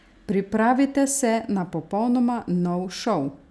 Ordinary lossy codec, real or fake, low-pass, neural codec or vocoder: none; real; none; none